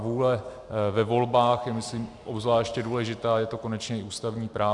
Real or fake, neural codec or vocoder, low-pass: real; none; 10.8 kHz